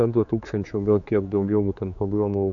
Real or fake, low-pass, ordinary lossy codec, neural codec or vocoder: fake; 7.2 kHz; AAC, 64 kbps; codec, 16 kHz, 4 kbps, X-Codec, HuBERT features, trained on general audio